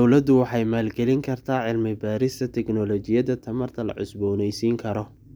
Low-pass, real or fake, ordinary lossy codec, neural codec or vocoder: none; real; none; none